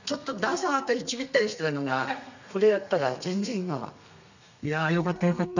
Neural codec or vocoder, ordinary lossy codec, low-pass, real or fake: codec, 32 kHz, 1.9 kbps, SNAC; none; 7.2 kHz; fake